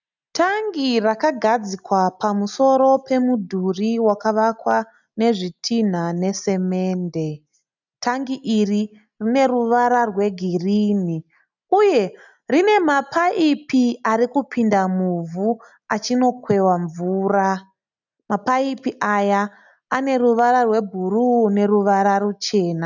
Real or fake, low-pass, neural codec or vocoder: real; 7.2 kHz; none